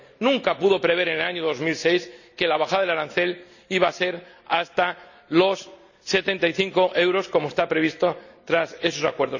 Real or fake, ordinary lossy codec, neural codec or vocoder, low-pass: real; none; none; 7.2 kHz